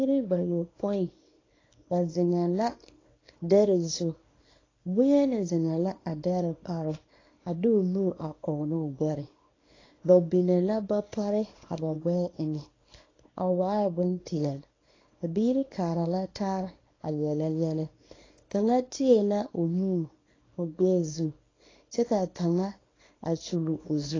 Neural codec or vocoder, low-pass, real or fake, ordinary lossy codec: codec, 24 kHz, 0.9 kbps, WavTokenizer, small release; 7.2 kHz; fake; AAC, 32 kbps